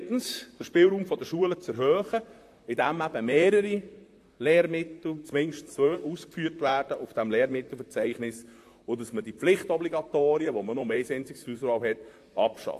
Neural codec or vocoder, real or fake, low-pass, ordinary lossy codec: vocoder, 44.1 kHz, 128 mel bands, Pupu-Vocoder; fake; 14.4 kHz; AAC, 64 kbps